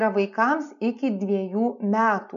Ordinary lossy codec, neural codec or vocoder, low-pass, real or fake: MP3, 64 kbps; none; 7.2 kHz; real